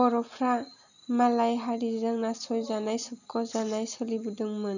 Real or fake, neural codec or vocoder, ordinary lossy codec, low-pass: real; none; none; 7.2 kHz